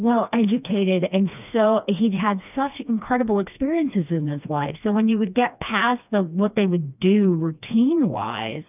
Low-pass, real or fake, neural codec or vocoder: 3.6 kHz; fake; codec, 16 kHz, 2 kbps, FreqCodec, smaller model